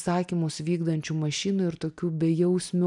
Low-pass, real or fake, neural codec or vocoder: 10.8 kHz; real; none